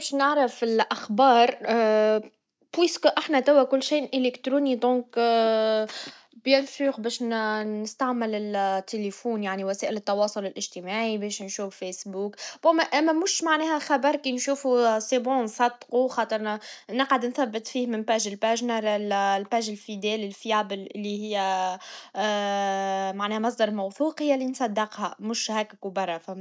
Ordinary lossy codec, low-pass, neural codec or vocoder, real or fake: none; none; none; real